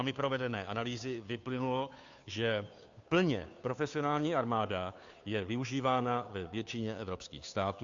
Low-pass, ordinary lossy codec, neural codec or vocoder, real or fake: 7.2 kHz; AAC, 64 kbps; codec, 16 kHz, 2 kbps, FunCodec, trained on Chinese and English, 25 frames a second; fake